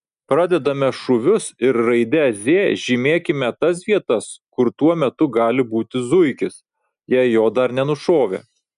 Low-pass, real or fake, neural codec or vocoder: 10.8 kHz; real; none